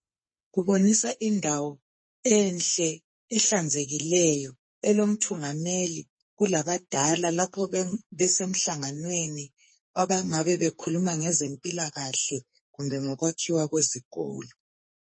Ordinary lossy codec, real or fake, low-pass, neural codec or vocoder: MP3, 32 kbps; fake; 9.9 kHz; codec, 44.1 kHz, 2.6 kbps, SNAC